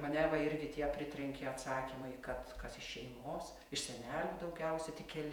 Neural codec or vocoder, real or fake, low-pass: vocoder, 48 kHz, 128 mel bands, Vocos; fake; 19.8 kHz